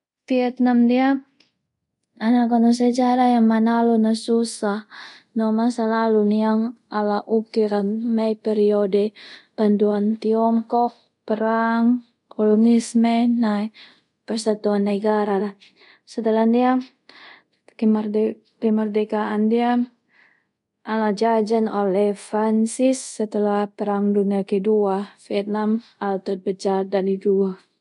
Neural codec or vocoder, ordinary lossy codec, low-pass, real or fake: codec, 24 kHz, 0.5 kbps, DualCodec; MP3, 64 kbps; 10.8 kHz; fake